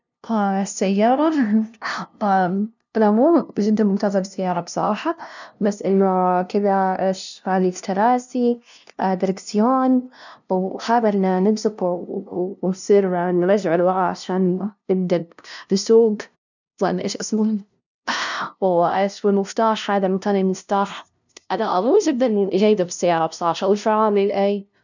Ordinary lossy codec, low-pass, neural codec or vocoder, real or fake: none; 7.2 kHz; codec, 16 kHz, 0.5 kbps, FunCodec, trained on LibriTTS, 25 frames a second; fake